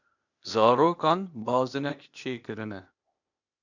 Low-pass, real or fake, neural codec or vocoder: 7.2 kHz; fake; codec, 16 kHz, 0.8 kbps, ZipCodec